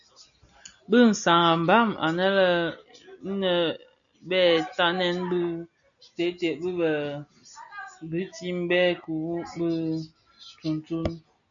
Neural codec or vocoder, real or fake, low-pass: none; real; 7.2 kHz